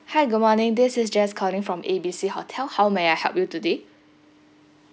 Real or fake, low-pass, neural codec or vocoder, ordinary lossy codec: real; none; none; none